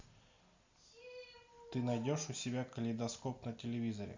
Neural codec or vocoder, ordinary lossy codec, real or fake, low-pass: none; MP3, 64 kbps; real; 7.2 kHz